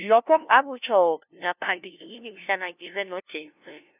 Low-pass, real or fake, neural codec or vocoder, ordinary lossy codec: 3.6 kHz; fake; codec, 16 kHz, 0.5 kbps, FunCodec, trained on LibriTTS, 25 frames a second; none